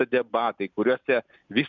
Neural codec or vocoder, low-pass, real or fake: none; 7.2 kHz; real